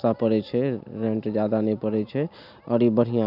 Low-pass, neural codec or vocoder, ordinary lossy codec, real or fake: 5.4 kHz; none; none; real